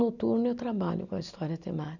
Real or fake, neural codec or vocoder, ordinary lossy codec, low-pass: real; none; none; 7.2 kHz